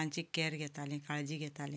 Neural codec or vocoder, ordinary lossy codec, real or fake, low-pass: none; none; real; none